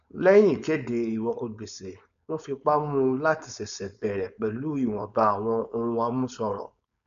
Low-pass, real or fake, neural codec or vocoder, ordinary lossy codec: 7.2 kHz; fake; codec, 16 kHz, 4.8 kbps, FACodec; none